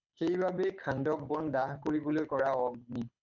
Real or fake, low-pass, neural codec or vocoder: fake; 7.2 kHz; codec, 24 kHz, 6 kbps, HILCodec